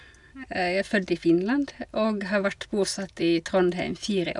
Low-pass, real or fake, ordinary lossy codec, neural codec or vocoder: 10.8 kHz; real; none; none